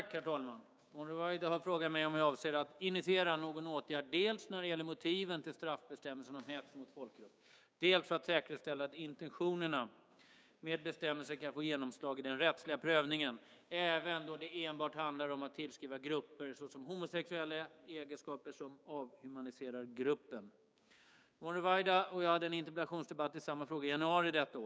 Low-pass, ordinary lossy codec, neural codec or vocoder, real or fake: none; none; codec, 16 kHz, 6 kbps, DAC; fake